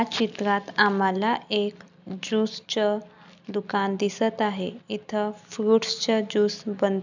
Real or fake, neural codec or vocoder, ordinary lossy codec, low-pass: real; none; none; 7.2 kHz